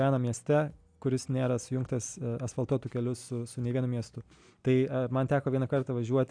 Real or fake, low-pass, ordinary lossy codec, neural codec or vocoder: real; 9.9 kHz; AAC, 64 kbps; none